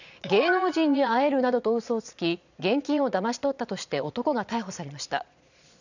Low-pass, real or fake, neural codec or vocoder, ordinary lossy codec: 7.2 kHz; fake; vocoder, 22.05 kHz, 80 mel bands, Vocos; none